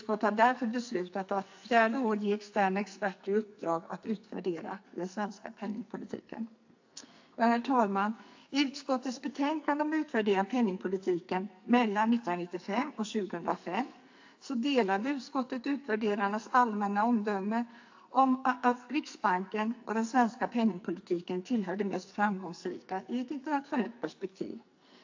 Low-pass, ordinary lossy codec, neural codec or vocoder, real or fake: 7.2 kHz; AAC, 48 kbps; codec, 44.1 kHz, 2.6 kbps, SNAC; fake